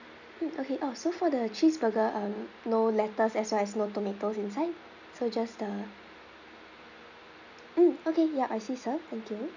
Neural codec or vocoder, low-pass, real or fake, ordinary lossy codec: none; 7.2 kHz; real; none